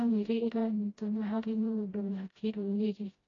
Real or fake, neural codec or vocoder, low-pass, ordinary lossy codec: fake; codec, 16 kHz, 0.5 kbps, FreqCodec, smaller model; 7.2 kHz; AAC, 32 kbps